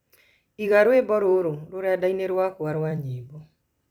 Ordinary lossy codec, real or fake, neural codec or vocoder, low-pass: none; fake; vocoder, 44.1 kHz, 128 mel bands every 256 samples, BigVGAN v2; 19.8 kHz